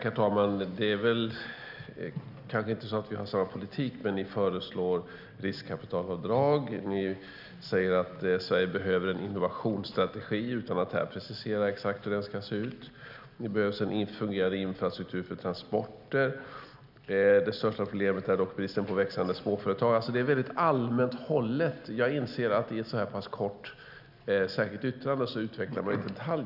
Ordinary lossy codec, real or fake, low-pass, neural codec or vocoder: none; real; 5.4 kHz; none